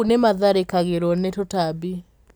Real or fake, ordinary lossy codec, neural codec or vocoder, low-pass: real; none; none; none